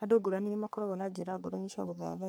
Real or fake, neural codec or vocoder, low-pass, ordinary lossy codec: fake; codec, 44.1 kHz, 3.4 kbps, Pupu-Codec; none; none